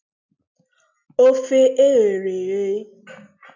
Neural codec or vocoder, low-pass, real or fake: none; 7.2 kHz; real